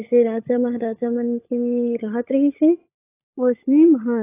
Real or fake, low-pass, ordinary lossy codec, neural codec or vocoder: fake; 3.6 kHz; AAC, 24 kbps; codec, 16 kHz, 16 kbps, FunCodec, trained on LibriTTS, 50 frames a second